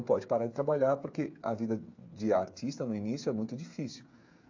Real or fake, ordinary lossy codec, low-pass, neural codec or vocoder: fake; AAC, 48 kbps; 7.2 kHz; codec, 16 kHz, 8 kbps, FreqCodec, smaller model